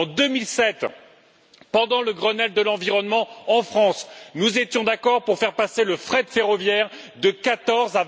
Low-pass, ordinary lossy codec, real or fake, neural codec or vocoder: none; none; real; none